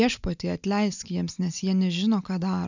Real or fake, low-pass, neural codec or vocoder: real; 7.2 kHz; none